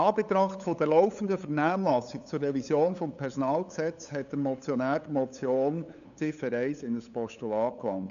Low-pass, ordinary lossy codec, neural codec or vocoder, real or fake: 7.2 kHz; none; codec, 16 kHz, 8 kbps, FunCodec, trained on LibriTTS, 25 frames a second; fake